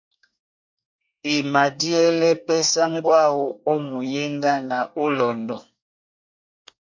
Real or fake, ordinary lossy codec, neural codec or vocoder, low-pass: fake; MP3, 48 kbps; codec, 24 kHz, 1 kbps, SNAC; 7.2 kHz